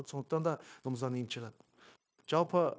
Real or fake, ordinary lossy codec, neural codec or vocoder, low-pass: fake; none; codec, 16 kHz, 0.9 kbps, LongCat-Audio-Codec; none